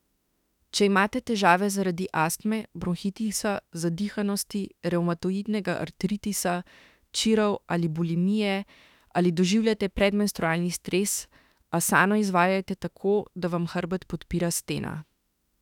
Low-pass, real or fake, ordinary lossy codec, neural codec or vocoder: 19.8 kHz; fake; none; autoencoder, 48 kHz, 32 numbers a frame, DAC-VAE, trained on Japanese speech